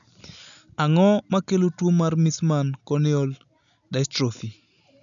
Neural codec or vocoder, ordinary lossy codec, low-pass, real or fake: none; none; 7.2 kHz; real